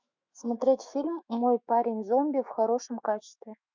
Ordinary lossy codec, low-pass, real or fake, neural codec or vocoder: MP3, 64 kbps; 7.2 kHz; fake; autoencoder, 48 kHz, 128 numbers a frame, DAC-VAE, trained on Japanese speech